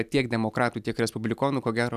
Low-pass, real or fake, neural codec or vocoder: 14.4 kHz; real; none